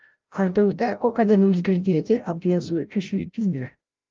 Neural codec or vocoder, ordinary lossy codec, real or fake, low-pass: codec, 16 kHz, 0.5 kbps, FreqCodec, larger model; Opus, 32 kbps; fake; 7.2 kHz